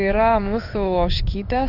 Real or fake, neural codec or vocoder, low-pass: fake; codec, 16 kHz in and 24 kHz out, 1 kbps, XY-Tokenizer; 5.4 kHz